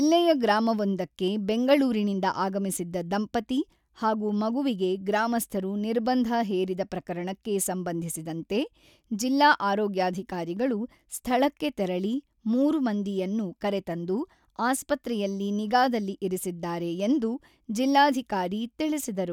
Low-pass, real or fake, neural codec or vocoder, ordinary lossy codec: 19.8 kHz; real; none; none